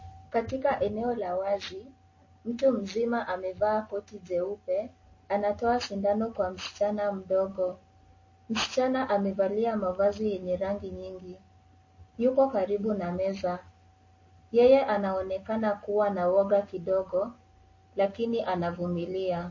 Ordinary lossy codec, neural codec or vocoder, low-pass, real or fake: MP3, 32 kbps; none; 7.2 kHz; real